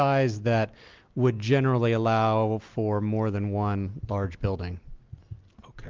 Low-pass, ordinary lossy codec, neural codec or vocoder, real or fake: 7.2 kHz; Opus, 32 kbps; none; real